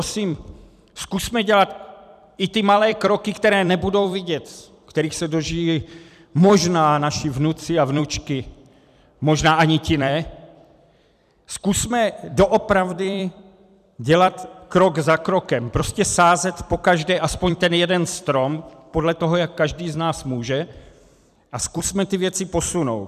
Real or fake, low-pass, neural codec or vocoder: fake; 14.4 kHz; vocoder, 44.1 kHz, 128 mel bands every 256 samples, BigVGAN v2